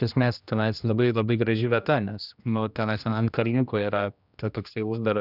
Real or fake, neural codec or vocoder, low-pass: fake; codec, 16 kHz, 1 kbps, X-Codec, HuBERT features, trained on general audio; 5.4 kHz